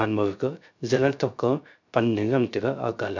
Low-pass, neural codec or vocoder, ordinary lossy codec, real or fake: 7.2 kHz; codec, 16 kHz, 0.3 kbps, FocalCodec; none; fake